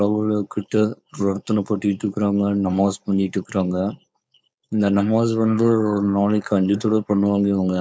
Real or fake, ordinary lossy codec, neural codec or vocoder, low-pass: fake; none; codec, 16 kHz, 4.8 kbps, FACodec; none